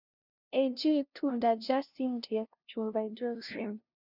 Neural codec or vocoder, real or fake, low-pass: codec, 16 kHz, 0.5 kbps, FunCodec, trained on LibriTTS, 25 frames a second; fake; 5.4 kHz